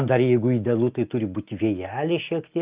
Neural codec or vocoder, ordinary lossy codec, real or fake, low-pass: none; Opus, 32 kbps; real; 3.6 kHz